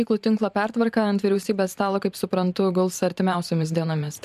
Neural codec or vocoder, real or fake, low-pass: vocoder, 44.1 kHz, 128 mel bands every 512 samples, BigVGAN v2; fake; 14.4 kHz